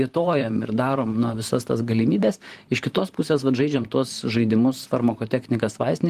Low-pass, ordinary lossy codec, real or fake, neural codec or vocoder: 14.4 kHz; Opus, 24 kbps; fake; vocoder, 44.1 kHz, 128 mel bands, Pupu-Vocoder